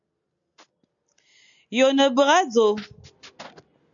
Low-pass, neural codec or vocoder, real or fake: 7.2 kHz; none; real